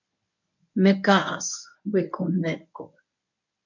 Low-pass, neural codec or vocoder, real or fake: 7.2 kHz; codec, 24 kHz, 0.9 kbps, WavTokenizer, medium speech release version 1; fake